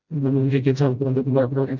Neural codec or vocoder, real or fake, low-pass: codec, 16 kHz, 0.5 kbps, FreqCodec, smaller model; fake; 7.2 kHz